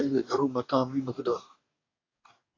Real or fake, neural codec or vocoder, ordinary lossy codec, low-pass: fake; codec, 44.1 kHz, 2.6 kbps, DAC; AAC, 32 kbps; 7.2 kHz